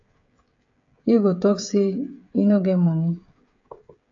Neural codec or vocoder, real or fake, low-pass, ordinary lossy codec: codec, 16 kHz, 16 kbps, FreqCodec, smaller model; fake; 7.2 kHz; AAC, 48 kbps